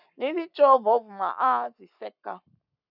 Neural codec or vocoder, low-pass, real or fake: codec, 44.1 kHz, 7.8 kbps, Pupu-Codec; 5.4 kHz; fake